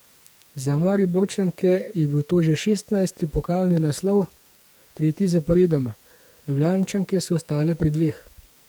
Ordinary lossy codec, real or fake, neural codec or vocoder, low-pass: none; fake; codec, 44.1 kHz, 2.6 kbps, SNAC; none